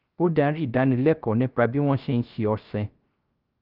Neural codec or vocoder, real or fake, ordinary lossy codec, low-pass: codec, 16 kHz, 0.3 kbps, FocalCodec; fake; Opus, 24 kbps; 5.4 kHz